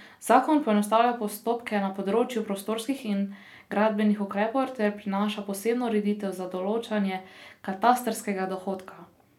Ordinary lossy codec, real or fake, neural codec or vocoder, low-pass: none; real; none; 19.8 kHz